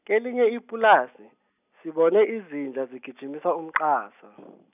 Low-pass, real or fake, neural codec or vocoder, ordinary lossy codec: 3.6 kHz; real; none; none